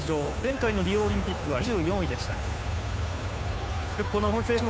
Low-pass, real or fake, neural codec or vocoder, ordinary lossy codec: none; fake; codec, 16 kHz, 2 kbps, FunCodec, trained on Chinese and English, 25 frames a second; none